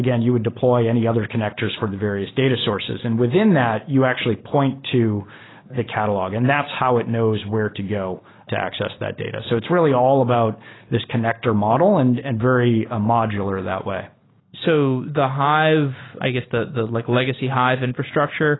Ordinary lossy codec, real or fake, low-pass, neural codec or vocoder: AAC, 16 kbps; real; 7.2 kHz; none